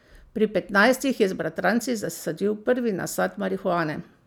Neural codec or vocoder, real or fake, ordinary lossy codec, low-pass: none; real; none; none